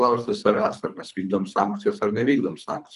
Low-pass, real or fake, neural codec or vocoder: 10.8 kHz; fake; codec, 24 kHz, 3 kbps, HILCodec